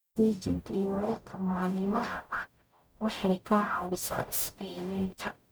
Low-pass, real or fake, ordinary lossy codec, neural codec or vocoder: none; fake; none; codec, 44.1 kHz, 0.9 kbps, DAC